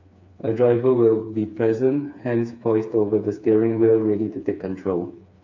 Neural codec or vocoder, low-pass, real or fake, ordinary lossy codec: codec, 16 kHz, 4 kbps, FreqCodec, smaller model; 7.2 kHz; fake; AAC, 48 kbps